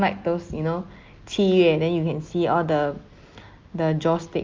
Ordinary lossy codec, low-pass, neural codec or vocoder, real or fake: Opus, 32 kbps; 7.2 kHz; none; real